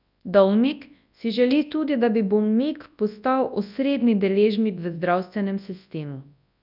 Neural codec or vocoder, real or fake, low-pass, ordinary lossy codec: codec, 24 kHz, 0.9 kbps, WavTokenizer, large speech release; fake; 5.4 kHz; none